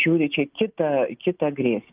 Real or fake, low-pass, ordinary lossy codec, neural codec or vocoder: real; 3.6 kHz; Opus, 24 kbps; none